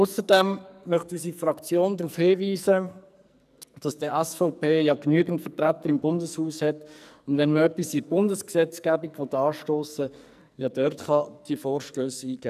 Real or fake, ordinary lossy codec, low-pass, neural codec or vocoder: fake; none; 14.4 kHz; codec, 44.1 kHz, 2.6 kbps, SNAC